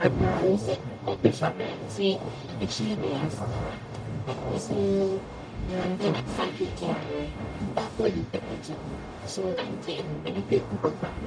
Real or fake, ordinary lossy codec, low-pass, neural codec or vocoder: fake; none; 9.9 kHz; codec, 44.1 kHz, 0.9 kbps, DAC